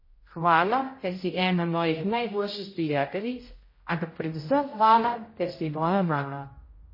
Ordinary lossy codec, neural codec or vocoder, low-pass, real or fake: MP3, 24 kbps; codec, 16 kHz, 0.5 kbps, X-Codec, HuBERT features, trained on general audio; 5.4 kHz; fake